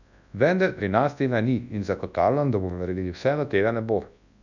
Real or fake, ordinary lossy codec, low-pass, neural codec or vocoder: fake; none; 7.2 kHz; codec, 24 kHz, 0.9 kbps, WavTokenizer, large speech release